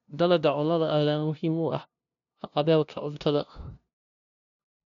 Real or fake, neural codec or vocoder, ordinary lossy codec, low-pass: fake; codec, 16 kHz, 0.5 kbps, FunCodec, trained on LibriTTS, 25 frames a second; none; 7.2 kHz